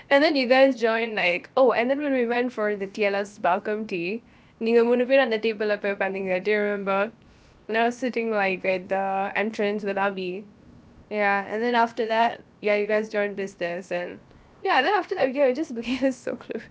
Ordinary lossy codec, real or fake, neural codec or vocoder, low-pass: none; fake; codec, 16 kHz, 0.7 kbps, FocalCodec; none